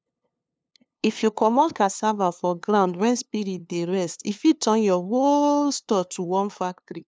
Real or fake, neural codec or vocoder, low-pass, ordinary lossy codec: fake; codec, 16 kHz, 2 kbps, FunCodec, trained on LibriTTS, 25 frames a second; none; none